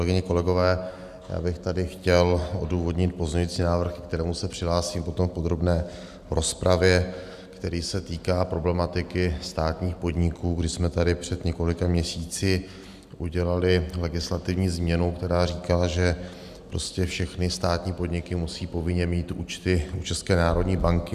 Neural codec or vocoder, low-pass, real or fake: none; 14.4 kHz; real